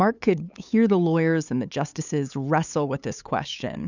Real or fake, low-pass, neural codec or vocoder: fake; 7.2 kHz; codec, 16 kHz, 8 kbps, FunCodec, trained on LibriTTS, 25 frames a second